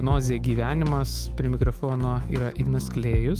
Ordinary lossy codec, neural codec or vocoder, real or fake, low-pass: Opus, 32 kbps; autoencoder, 48 kHz, 128 numbers a frame, DAC-VAE, trained on Japanese speech; fake; 14.4 kHz